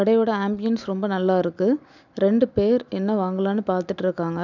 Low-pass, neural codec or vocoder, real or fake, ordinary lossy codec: 7.2 kHz; none; real; none